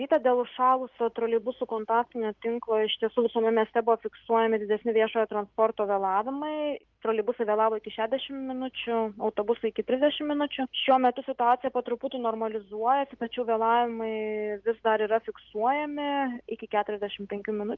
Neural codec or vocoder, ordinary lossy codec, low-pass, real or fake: none; Opus, 32 kbps; 7.2 kHz; real